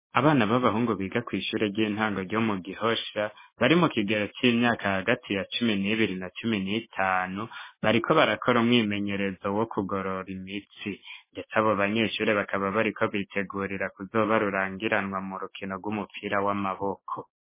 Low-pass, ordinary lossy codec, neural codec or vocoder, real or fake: 3.6 kHz; MP3, 16 kbps; codec, 16 kHz, 6 kbps, DAC; fake